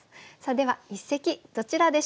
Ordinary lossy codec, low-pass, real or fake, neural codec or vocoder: none; none; real; none